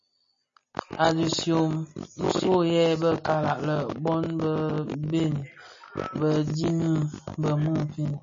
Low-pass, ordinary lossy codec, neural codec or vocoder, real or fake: 7.2 kHz; MP3, 32 kbps; none; real